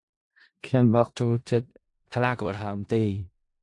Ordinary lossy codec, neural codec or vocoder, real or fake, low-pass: AAC, 64 kbps; codec, 16 kHz in and 24 kHz out, 0.4 kbps, LongCat-Audio-Codec, four codebook decoder; fake; 10.8 kHz